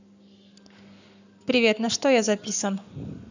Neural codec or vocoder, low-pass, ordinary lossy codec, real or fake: codec, 44.1 kHz, 7.8 kbps, Pupu-Codec; 7.2 kHz; none; fake